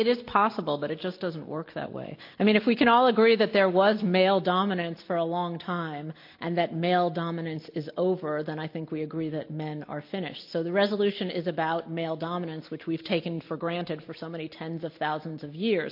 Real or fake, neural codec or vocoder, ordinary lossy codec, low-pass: real; none; MP3, 32 kbps; 5.4 kHz